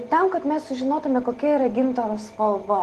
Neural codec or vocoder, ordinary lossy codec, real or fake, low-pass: none; Opus, 16 kbps; real; 14.4 kHz